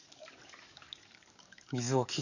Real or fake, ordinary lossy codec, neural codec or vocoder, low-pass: fake; none; codec, 44.1 kHz, 7.8 kbps, DAC; 7.2 kHz